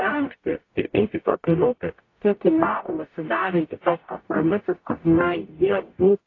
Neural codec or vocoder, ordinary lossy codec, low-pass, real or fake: codec, 44.1 kHz, 0.9 kbps, DAC; AAC, 32 kbps; 7.2 kHz; fake